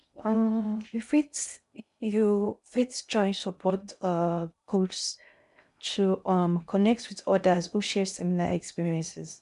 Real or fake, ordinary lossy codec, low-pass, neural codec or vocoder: fake; none; 10.8 kHz; codec, 16 kHz in and 24 kHz out, 0.6 kbps, FocalCodec, streaming, 2048 codes